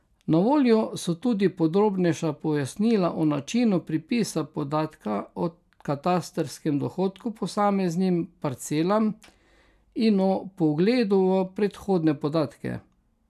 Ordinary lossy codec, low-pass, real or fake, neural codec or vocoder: none; 14.4 kHz; real; none